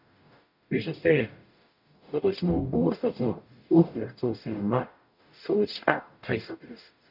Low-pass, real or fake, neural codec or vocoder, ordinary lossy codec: 5.4 kHz; fake; codec, 44.1 kHz, 0.9 kbps, DAC; none